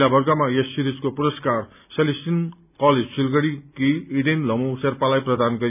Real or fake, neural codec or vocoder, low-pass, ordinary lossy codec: real; none; 3.6 kHz; none